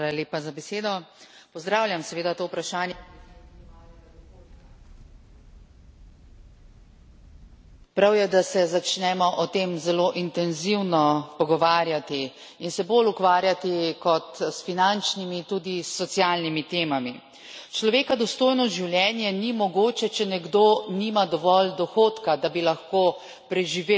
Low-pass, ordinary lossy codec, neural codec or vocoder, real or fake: none; none; none; real